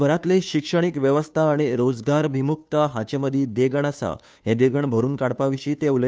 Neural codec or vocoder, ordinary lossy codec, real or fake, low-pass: codec, 16 kHz, 2 kbps, FunCodec, trained on Chinese and English, 25 frames a second; none; fake; none